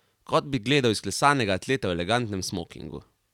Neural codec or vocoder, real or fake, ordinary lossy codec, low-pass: none; real; none; 19.8 kHz